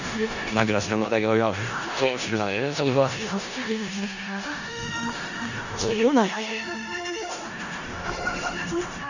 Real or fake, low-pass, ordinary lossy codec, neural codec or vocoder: fake; 7.2 kHz; none; codec, 16 kHz in and 24 kHz out, 0.4 kbps, LongCat-Audio-Codec, four codebook decoder